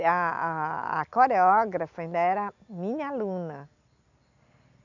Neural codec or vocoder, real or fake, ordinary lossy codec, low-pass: none; real; none; 7.2 kHz